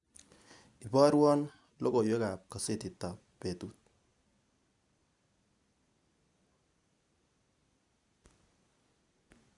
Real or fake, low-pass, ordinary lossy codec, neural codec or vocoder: real; 10.8 kHz; none; none